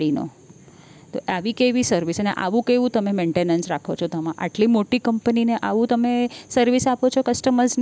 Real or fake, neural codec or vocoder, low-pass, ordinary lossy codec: real; none; none; none